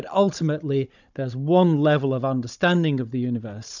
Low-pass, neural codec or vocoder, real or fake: 7.2 kHz; codec, 16 kHz, 16 kbps, FunCodec, trained on Chinese and English, 50 frames a second; fake